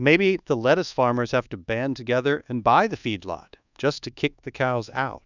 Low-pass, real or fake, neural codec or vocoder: 7.2 kHz; fake; codec, 24 kHz, 1.2 kbps, DualCodec